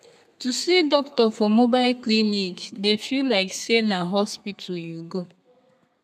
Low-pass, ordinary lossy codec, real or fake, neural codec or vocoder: 14.4 kHz; none; fake; codec, 32 kHz, 1.9 kbps, SNAC